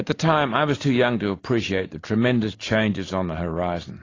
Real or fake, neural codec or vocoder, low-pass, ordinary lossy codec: real; none; 7.2 kHz; AAC, 32 kbps